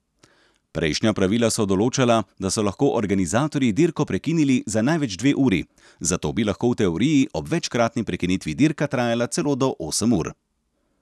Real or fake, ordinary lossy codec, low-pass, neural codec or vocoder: real; none; none; none